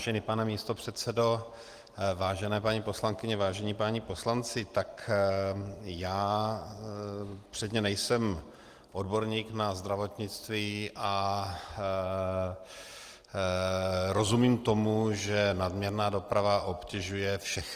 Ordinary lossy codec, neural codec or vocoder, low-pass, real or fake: Opus, 24 kbps; none; 14.4 kHz; real